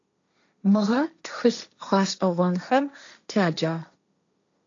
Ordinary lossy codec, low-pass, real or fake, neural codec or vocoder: AAC, 64 kbps; 7.2 kHz; fake; codec, 16 kHz, 1.1 kbps, Voila-Tokenizer